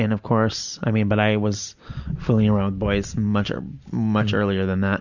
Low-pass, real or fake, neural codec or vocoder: 7.2 kHz; real; none